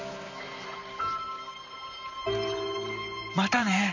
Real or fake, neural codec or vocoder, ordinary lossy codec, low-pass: fake; vocoder, 44.1 kHz, 128 mel bands, Pupu-Vocoder; none; 7.2 kHz